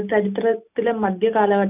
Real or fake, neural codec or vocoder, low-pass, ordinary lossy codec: real; none; 3.6 kHz; none